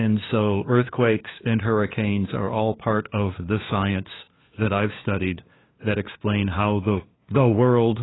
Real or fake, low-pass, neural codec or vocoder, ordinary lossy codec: fake; 7.2 kHz; codec, 16 kHz, 2 kbps, FunCodec, trained on LibriTTS, 25 frames a second; AAC, 16 kbps